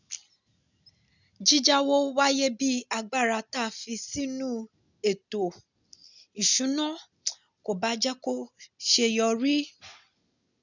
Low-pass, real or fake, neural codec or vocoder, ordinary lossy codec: 7.2 kHz; real; none; none